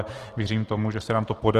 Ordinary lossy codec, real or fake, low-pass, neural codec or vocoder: Opus, 16 kbps; real; 14.4 kHz; none